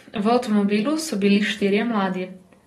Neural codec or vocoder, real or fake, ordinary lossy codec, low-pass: none; real; AAC, 32 kbps; 19.8 kHz